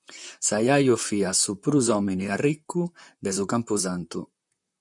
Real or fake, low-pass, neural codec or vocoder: fake; 10.8 kHz; vocoder, 44.1 kHz, 128 mel bands, Pupu-Vocoder